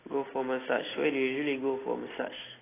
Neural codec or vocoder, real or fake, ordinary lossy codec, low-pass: none; real; AAC, 16 kbps; 3.6 kHz